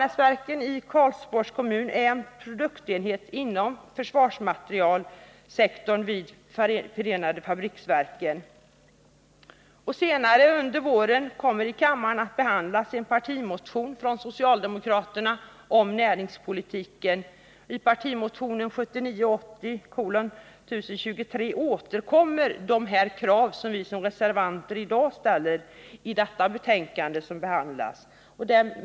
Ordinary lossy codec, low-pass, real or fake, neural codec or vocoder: none; none; real; none